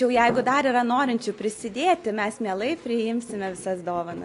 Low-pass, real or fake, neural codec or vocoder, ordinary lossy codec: 10.8 kHz; real; none; AAC, 48 kbps